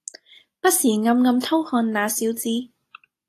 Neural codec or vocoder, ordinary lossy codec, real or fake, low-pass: none; AAC, 48 kbps; real; 14.4 kHz